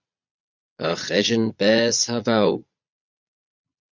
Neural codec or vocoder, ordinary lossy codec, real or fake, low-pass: vocoder, 22.05 kHz, 80 mel bands, Vocos; MP3, 64 kbps; fake; 7.2 kHz